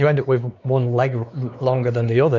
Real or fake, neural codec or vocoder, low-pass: fake; codec, 16 kHz, 4 kbps, X-Codec, WavLM features, trained on Multilingual LibriSpeech; 7.2 kHz